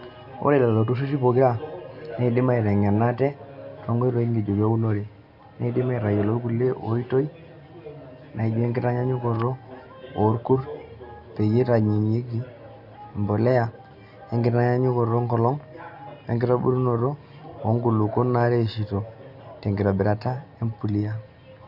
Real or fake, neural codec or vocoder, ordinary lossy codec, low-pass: real; none; none; 5.4 kHz